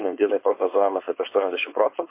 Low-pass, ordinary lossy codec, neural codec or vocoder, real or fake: 3.6 kHz; MP3, 24 kbps; codec, 16 kHz, 4.8 kbps, FACodec; fake